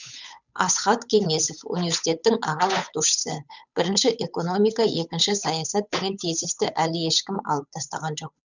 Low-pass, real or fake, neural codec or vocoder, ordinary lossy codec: 7.2 kHz; fake; codec, 16 kHz, 8 kbps, FunCodec, trained on Chinese and English, 25 frames a second; none